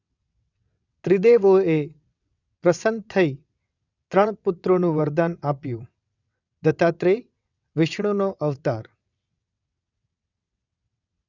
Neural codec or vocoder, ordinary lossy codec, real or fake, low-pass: vocoder, 22.05 kHz, 80 mel bands, WaveNeXt; none; fake; 7.2 kHz